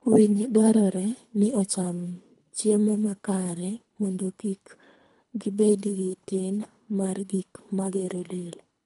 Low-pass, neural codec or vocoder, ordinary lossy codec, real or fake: 10.8 kHz; codec, 24 kHz, 3 kbps, HILCodec; none; fake